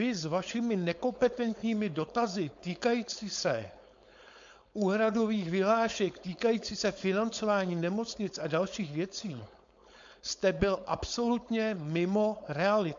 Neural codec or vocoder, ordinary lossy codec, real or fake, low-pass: codec, 16 kHz, 4.8 kbps, FACodec; MP3, 64 kbps; fake; 7.2 kHz